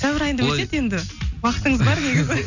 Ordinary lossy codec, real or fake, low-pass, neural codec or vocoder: none; real; 7.2 kHz; none